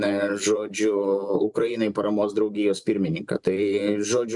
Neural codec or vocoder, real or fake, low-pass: vocoder, 44.1 kHz, 128 mel bands every 512 samples, BigVGAN v2; fake; 10.8 kHz